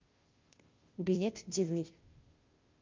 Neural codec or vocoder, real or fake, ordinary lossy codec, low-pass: codec, 16 kHz, 0.5 kbps, FunCodec, trained on Chinese and English, 25 frames a second; fake; Opus, 24 kbps; 7.2 kHz